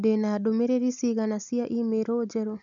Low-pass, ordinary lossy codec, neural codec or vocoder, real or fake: 7.2 kHz; none; none; real